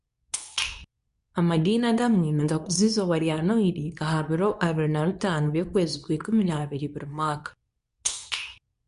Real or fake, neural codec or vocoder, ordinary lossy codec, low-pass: fake; codec, 24 kHz, 0.9 kbps, WavTokenizer, medium speech release version 2; none; 10.8 kHz